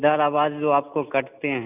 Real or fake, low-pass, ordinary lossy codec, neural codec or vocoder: real; 3.6 kHz; none; none